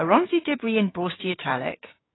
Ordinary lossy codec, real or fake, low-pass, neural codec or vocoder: AAC, 16 kbps; fake; 7.2 kHz; codec, 16 kHz, 6 kbps, DAC